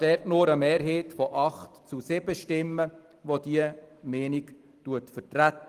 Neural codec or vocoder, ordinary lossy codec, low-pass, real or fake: vocoder, 44.1 kHz, 128 mel bands every 256 samples, BigVGAN v2; Opus, 24 kbps; 14.4 kHz; fake